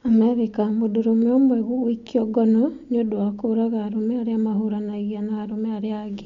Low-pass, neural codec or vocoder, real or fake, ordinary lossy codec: 7.2 kHz; none; real; MP3, 48 kbps